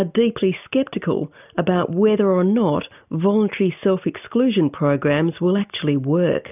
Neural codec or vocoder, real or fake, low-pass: none; real; 3.6 kHz